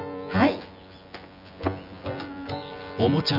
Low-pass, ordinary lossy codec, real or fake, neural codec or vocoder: 5.4 kHz; none; fake; vocoder, 24 kHz, 100 mel bands, Vocos